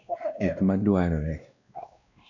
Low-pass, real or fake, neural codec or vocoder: 7.2 kHz; fake; codec, 16 kHz, 1 kbps, X-Codec, WavLM features, trained on Multilingual LibriSpeech